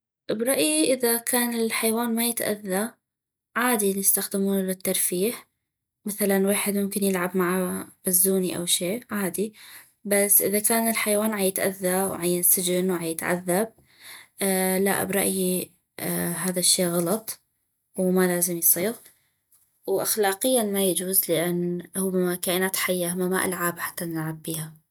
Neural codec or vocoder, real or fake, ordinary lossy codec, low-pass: none; real; none; none